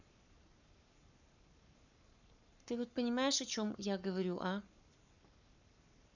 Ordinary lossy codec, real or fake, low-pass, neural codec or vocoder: none; fake; 7.2 kHz; codec, 44.1 kHz, 7.8 kbps, Pupu-Codec